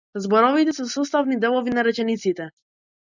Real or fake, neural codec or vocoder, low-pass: real; none; 7.2 kHz